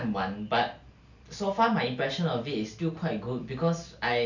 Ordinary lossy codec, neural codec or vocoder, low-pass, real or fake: none; none; 7.2 kHz; real